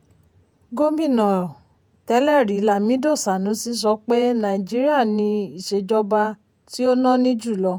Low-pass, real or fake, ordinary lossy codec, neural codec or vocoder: none; fake; none; vocoder, 48 kHz, 128 mel bands, Vocos